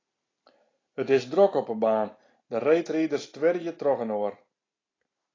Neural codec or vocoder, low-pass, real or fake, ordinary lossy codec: none; 7.2 kHz; real; AAC, 32 kbps